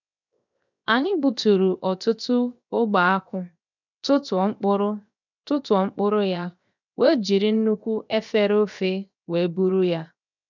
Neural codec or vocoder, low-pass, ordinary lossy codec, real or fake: codec, 16 kHz, 0.7 kbps, FocalCodec; 7.2 kHz; none; fake